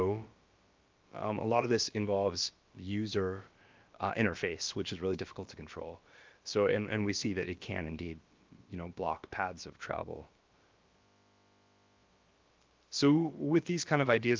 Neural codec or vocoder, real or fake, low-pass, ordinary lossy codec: codec, 16 kHz, about 1 kbps, DyCAST, with the encoder's durations; fake; 7.2 kHz; Opus, 24 kbps